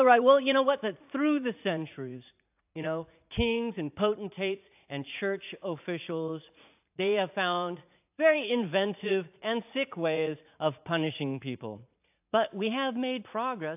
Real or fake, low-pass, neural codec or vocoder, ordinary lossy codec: fake; 3.6 kHz; vocoder, 44.1 kHz, 80 mel bands, Vocos; AAC, 32 kbps